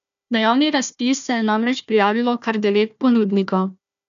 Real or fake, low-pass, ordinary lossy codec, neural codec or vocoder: fake; 7.2 kHz; none; codec, 16 kHz, 1 kbps, FunCodec, trained on Chinese and English, 50 frames a second